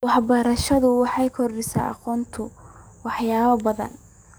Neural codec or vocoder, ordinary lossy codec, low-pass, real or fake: codec, 44.1 kHz, 7.8 kbps, DAC; none; none; fake